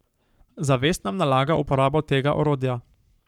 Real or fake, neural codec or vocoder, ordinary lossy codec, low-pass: fake; codec, 44.1 kHz, 7.8 kbps, Pupu-Codec; none; 19.8 kHz